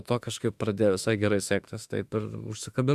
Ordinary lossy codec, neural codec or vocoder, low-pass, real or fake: Opus, 64 kbps; autoencoder, 48 kHz, 32 numbers a frame, DAC-VAE, trained on Japanese speech; 14.4 kHz; fake